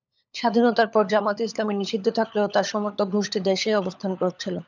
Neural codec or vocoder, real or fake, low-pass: codec, 16 kHz, 16 kbps, FunCodec, trained on LibriTTS, 50 frames a second; fake; 7.2 kHz